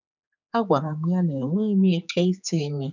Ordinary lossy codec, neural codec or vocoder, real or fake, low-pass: none; codec, 16 kHz, 4 kbps, X-Codec, HuBERT features, trained on general audio; fake; 7.2 kHz